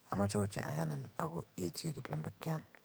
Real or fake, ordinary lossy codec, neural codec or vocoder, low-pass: fake; none; codec, 44.1 kHz, 2.6 kbps, SNAC; none